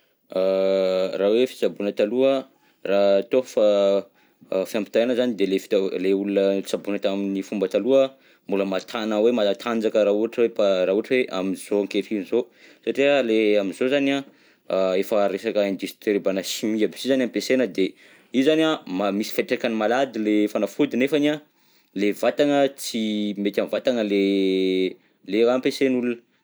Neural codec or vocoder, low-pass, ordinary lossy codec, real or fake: none; none; none; real